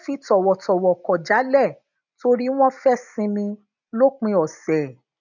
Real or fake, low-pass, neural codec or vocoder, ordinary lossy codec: real; 7.2 kHz; none; none